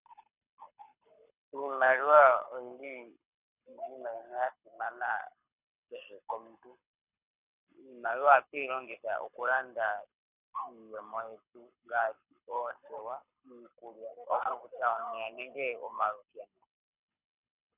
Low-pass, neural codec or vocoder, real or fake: 3.6 kHz; codec, 24 kHz, 6 kbps, HILCodec; fake